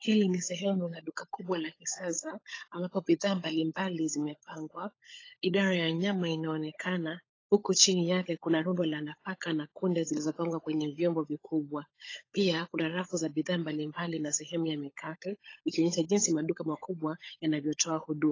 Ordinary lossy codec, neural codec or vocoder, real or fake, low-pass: AAC, 32 kbps; codec, 16 kHz, 16 kbps, FunCodec, trained on LibriTTS, 50 frames a second; fake; 7.2 kHz